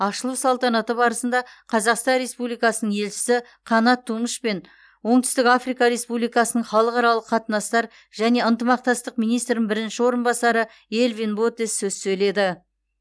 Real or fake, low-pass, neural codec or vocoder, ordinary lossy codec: real; none; none; none